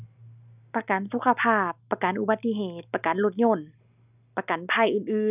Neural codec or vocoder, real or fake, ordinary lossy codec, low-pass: none; real; none; 3.6 kHz